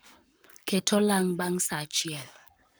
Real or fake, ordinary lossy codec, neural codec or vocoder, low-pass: fake; none; codec, 44.1 kHz, 7.8 kbps, Pupu-Codec; none